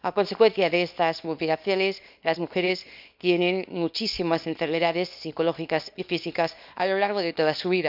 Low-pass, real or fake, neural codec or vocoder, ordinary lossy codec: 5.4 kHz; fake; codec, 24 kHz, 0.9 kbps, WavTokenizer, small release; none